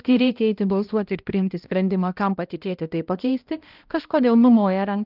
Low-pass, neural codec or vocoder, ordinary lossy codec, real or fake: 5.4 kHz; codec, 16 kHz, 1 kbps, X-Codec, HuBERT features, trained on balanced general audio; Opus, 24 kbps; fake